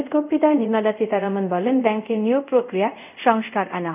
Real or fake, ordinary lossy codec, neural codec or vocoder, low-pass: fake; none; codec, 24 kHz, 0.5 kbps, DualCodec; 3.6 kHz